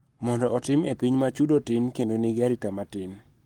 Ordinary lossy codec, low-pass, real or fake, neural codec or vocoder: Opus, 24 kbps; 19.8 kHz; fake; codec, 44.1 kHz, 7.8 kbps, Pupu-Codec